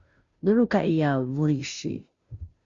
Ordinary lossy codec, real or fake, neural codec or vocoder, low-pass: Opus, 64 kbps; fake; codec, 16 kHz, 0.5 kbps, FunCodec, trained on Chinese and English, 25 frames a second; 7.2 kHz